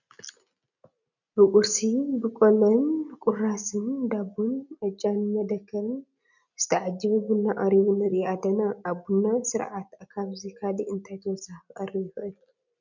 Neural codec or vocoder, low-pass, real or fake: none; 7.2 kHz; real